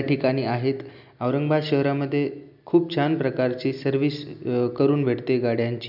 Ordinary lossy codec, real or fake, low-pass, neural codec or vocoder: none; real; 5.4 kHz; none